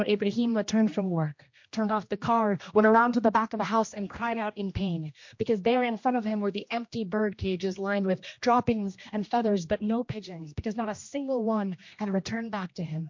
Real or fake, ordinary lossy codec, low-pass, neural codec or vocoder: fake; MP3, 48 kbps; 7.2 kHz; codec, 16 kHz, 1 kbps, X-Codec, HuBERT features, trained on general audio